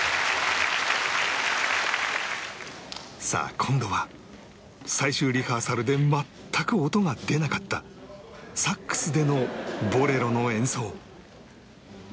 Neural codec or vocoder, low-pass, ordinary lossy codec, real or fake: none; none; none; real